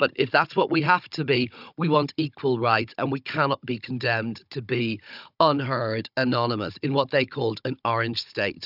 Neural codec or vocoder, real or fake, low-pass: codec, 16 kHz, 16 kbps, FunCodec, trained on Chinese and English, 50 frames a second; fake; 5.4 kHz